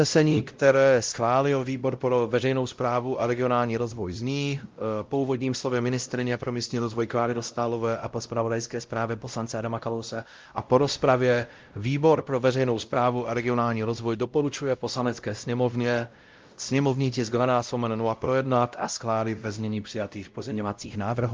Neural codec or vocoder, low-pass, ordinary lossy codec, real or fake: codec, 16 kHz, 0.5 kbps, X-Codec, WavLM features, trained on Multilingual LibriSpeech; 7.2 kHz; Opus, 32 kbps; fake